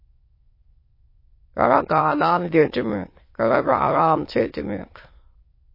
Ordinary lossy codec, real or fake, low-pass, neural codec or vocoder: MP3, 24 kbps; fake; 5.4 kHz; autoencoder, 22.05 kHz, a latent of 192 numbers a frame, VITS, trained on many speakers